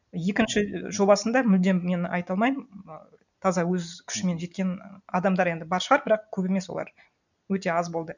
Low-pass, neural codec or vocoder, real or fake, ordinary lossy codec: 7.2 kHz; none; real; none